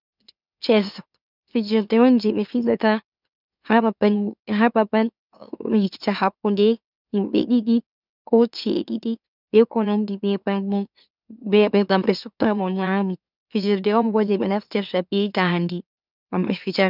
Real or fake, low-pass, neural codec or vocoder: fake; 5.4 kHz; autoencoder, 44.1 kHz, a latent of 192 numbers a frame, MeloTTS